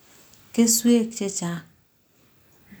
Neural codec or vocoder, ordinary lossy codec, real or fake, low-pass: none; none; real; none